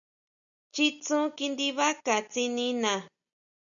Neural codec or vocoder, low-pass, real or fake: none; 7.2 kHz; real